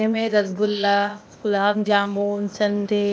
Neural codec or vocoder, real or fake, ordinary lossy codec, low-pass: codec, 16 kHz, 0.8 kbps, ZipCodec; fake; none; none